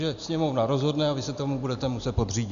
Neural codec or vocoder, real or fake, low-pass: none; real; 7.2 kHz